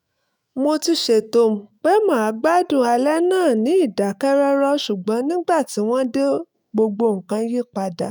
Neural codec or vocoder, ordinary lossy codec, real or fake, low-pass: autoencoder, 48 kHz, 128 numbers a frame, DAC-VAE, trained on Japanese speech; none; fake; none